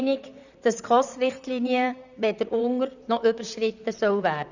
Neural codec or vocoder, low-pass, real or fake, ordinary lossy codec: vocoder, 44.1 kHz, 128 mel bands, Pupu-Vocoder; 7.2 kHz; fake; none